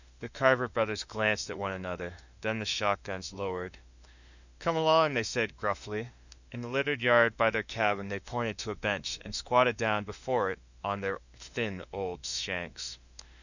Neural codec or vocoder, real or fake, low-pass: autoencoder, 48 kHz, 32 numbers a frame, DAC-VAE, trained on Japanese speech; fake; 7.2 kHz